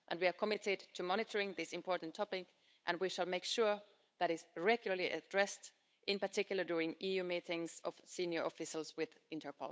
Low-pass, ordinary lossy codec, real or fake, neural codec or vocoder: none; none; fake; codec, 16 kHz, 8 kbps, FunCodec, trained on Chinese and English, 25 frames a second